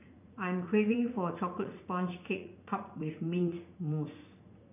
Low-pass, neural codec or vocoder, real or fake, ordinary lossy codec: 3.6 kHz; vocoder, 44.1 kHz, 80 mel bands, Vocos; fake; none